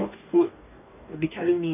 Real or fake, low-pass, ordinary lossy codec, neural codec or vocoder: fake; 3.6 kHz; none; codec, 44.1 kHz, 2.6 kbps, DAC